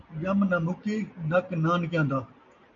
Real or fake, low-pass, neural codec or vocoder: real; 7.2 kHz; none